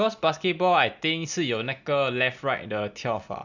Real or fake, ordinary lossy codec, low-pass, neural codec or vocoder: real; none; 7.2 kHz; none